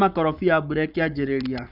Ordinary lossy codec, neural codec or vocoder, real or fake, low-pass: none; codec, 44.1 kHz, 7.8 kbps, Pupu-Codec; fake; 5.4 kHz